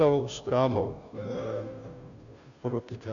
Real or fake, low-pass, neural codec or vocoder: fake; 7.2 kHz; codec, 16 kHz, 0.5 kbps, FunCodec, trained on Chinese and English, 25 frames a second